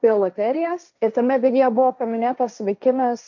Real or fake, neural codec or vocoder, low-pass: fake; codec, 16 kHz, 1.1 kbps, Voila-Tokenizer; 7.2 kHz